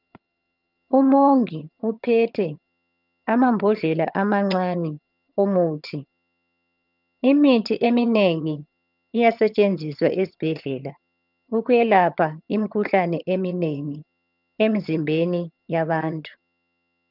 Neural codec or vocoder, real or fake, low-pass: vocoder, 22.05 kHz, 80 mel bands, HiFi-GAN; fake; 5.4 kHz